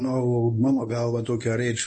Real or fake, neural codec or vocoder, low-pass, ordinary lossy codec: fake; codec, 24 kHz, 0.9 kbps, WavTokenizer, medium speech release version 1; 10.8 kHz; MP3, 32 kbps